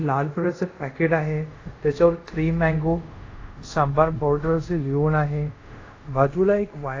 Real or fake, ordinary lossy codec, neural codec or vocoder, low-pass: fake; AAC, 32 kbps; codec, 24 kHz, 0.5 kbps, DualCodec; 7.2 kHz